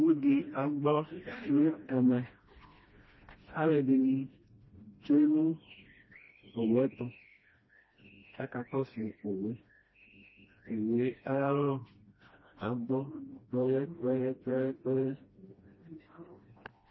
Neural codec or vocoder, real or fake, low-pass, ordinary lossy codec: codec, 16 kHz, 1 kbps, FreqCodec, smaller model; fake; 7.2 kHz; MP3, 24 kbps